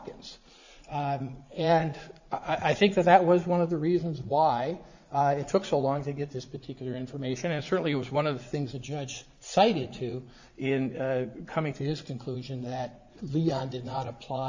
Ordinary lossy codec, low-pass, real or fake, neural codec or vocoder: Opus, 64 kbps; 7.2 kHz; fake; vocoder, 44.1 kHz, 80 mel bands, Vocos